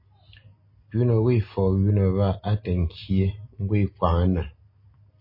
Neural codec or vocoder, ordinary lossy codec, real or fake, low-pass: none; MP3, 24 kbps; real; 5.4 kHz